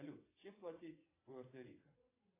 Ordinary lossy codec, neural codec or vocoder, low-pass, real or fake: AAC, 24 kbps; vocoder, 22.05 kHz, 80 mel bands, WaveNeXt; 3.6 kHz; fake